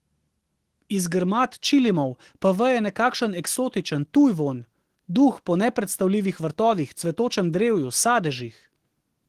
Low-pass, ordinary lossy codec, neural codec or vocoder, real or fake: 14.4 kHz; Opus, 16 kbps; autoencoder, 48 kHz, 128 numbers a frame, DAC-VAE, trained on Japanese speech; fake